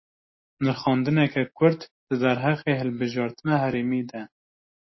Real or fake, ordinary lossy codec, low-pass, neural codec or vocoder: real; MP3, 24 kbps; 7.2 kHz; none